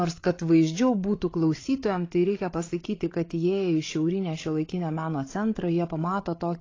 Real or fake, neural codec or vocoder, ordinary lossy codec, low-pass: fake; codec, 16 kHz, 8 kbps, FreqCodec, larger model; AAC, 32 kbps; 7.2 kHz